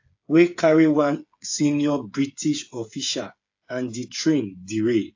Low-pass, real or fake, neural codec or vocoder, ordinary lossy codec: 7.2 kHz; fake; codec, 16 kHz, 8 kbps, FreqCodec, smaller model; AAC, 48 kbps